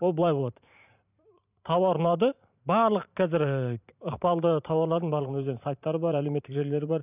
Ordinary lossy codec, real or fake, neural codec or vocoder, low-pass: none; fake; vocoder, 44.1 kHz, 128 mel bands every 512 samples, BigVGAN v2; 3.6 kHz